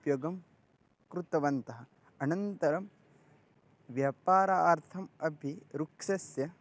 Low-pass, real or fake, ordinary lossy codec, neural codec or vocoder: none; real; none; none